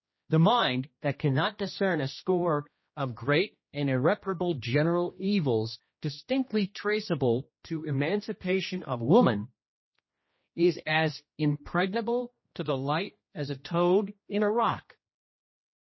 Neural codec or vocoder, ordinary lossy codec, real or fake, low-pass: codec, 16 kHz, 1 kbps, X-Codec, HuBERT features, trained on general audio; MP3, 24 kbps; fake; 7.2 kHz